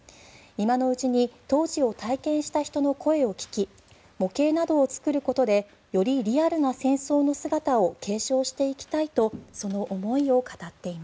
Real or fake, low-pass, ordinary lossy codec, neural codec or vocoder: real; none; none; none